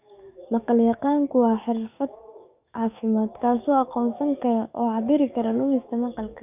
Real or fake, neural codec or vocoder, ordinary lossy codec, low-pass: fake; codec, 44.1 kHz, 7.8 kbps, DAC; none; 3.6 kHz